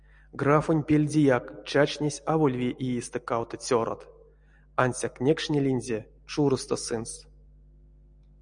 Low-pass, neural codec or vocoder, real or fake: 9.9 kHz; none; real